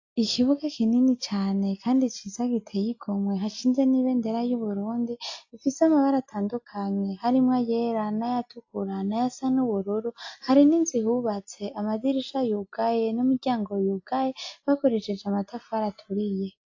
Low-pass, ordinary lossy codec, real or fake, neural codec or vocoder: 7.2 kHz; AAC, 48 kbps; real; none